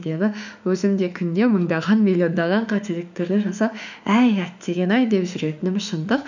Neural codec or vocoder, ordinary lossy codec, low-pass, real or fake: autoencoder, 48 kHz, 32 numbers a frame, DAC-VAE, trained on Japanese speech; none; 7.2 kHz; fake